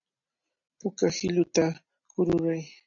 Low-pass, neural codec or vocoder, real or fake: 7.2 kHz; none; real